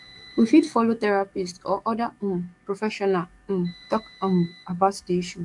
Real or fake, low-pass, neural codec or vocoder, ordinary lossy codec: fake; 10.8 kHz; codec, 44.1 kHz, 7.8 kbps, DAC; AAC, 64 kbps